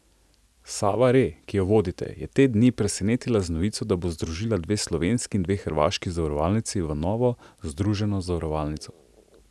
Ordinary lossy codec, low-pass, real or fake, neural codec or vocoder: none; none; real; none